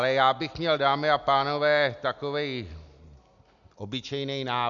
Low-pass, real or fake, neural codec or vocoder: 7.2 kHz; real; none